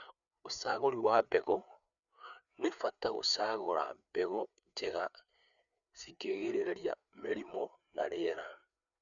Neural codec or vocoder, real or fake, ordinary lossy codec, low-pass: codec, 16 kHz, 4 kbps, FreqCodec, larger model; fake; none; 7.2 kHz